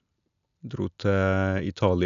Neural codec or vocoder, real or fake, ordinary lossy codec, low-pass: none; real; none; 7.2 kHz